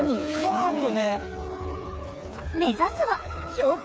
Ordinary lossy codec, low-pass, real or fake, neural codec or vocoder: none; none; fake; codec, 16 kHz, 4 kbps, FreqCodec, smaller model